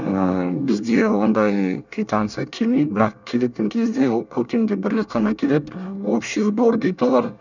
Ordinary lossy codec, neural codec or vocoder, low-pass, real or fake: none; codec, 24 kHz, 1 kbps, SNAC; 7.2 kHz; fake